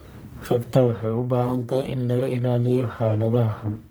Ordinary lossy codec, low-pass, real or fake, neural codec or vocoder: none; none; fake; codec, 44.1 kHz, 1.7 kbps, Pupu-Codec